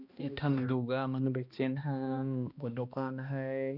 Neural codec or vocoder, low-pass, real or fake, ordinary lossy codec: codec, 16 kHz, 1 kbps, X-Codec, HuBERT features, trained on balanced general audio; 5.4 kHz; fake; none